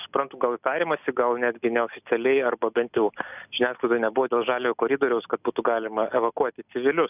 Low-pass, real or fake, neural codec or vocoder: 3.6 kHz; real; none